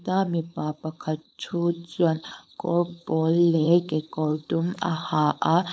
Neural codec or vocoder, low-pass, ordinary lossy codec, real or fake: codec, 16 kHz, 4.8 kbps, FACodec; none; none; fake